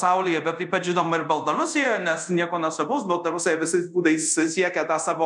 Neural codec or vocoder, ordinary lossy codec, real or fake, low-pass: codec, 24 kHz, 0.5 kbps, DualCodec; MP3, 96 kbps; fake; 10.8 kHz